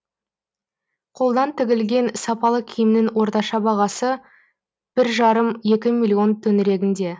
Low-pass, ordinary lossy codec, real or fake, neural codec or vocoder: none; none; real; none